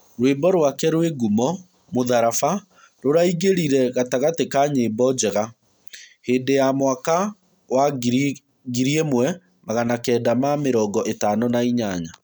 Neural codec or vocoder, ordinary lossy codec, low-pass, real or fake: none; none; none; real